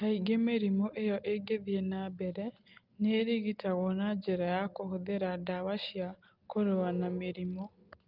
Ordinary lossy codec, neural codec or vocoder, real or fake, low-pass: Opus, 32 kbps; none; real; 5.4 kHz